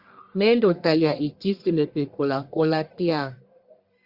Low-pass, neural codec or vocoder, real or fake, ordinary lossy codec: 5.4 kHz; codec, 44.1 kHz, 1.7 kbps, Pupu-Codec; fake; Opus, 64 kbps